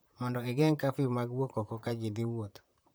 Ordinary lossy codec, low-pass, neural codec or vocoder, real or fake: none; none; vocoder, 44.1 kHz, 128 mel bands, Pupu-Vocoder; fake